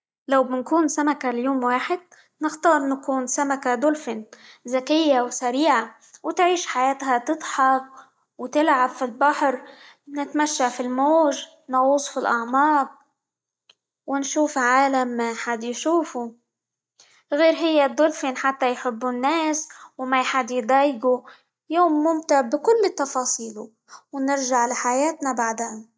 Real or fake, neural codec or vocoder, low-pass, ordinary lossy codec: real; none; none; none